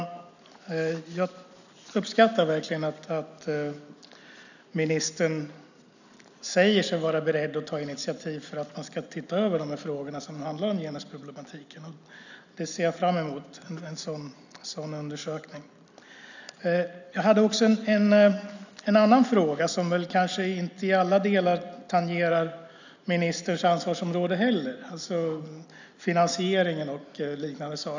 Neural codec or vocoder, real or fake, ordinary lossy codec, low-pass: none; real; none; 7.2 kHz